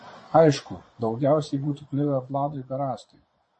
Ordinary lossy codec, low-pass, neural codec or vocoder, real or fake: MP3, 32 kbps; 9.9 kHz; vocoder, 22.05 kHz, 80 mel bands, Vocos; fake